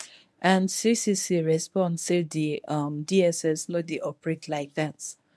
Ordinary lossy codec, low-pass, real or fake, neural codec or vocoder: none; none; fake; codec, 24 kHz, 0.9 kbps, WavTokenizer, medium speech release version 1